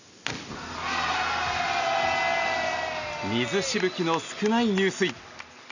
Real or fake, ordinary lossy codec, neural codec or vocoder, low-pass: real; none; none; 7.2 kHz